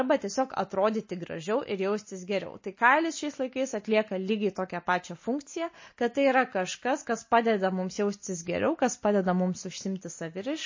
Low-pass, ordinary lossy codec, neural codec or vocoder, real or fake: 7.2 kHz; MP3, 32 kbps; none; real